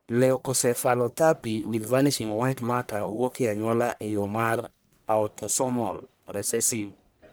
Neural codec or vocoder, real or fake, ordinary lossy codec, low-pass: codec, 44.1 kHz, 1.7 kbps, Pupu-Codec; fake; none; none